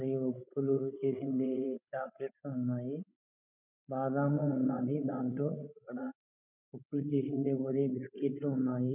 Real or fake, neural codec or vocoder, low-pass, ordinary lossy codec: fake; codec, 16 kHz, 16 kbps, FreqCodec, larger model; 3.6 kHz; none